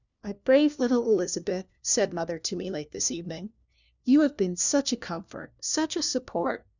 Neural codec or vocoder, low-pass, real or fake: codec, 16 kHz, 1 kbps, FunCodec, trained on LibriTTS, 50 frames a second; 7.2 kHz; fake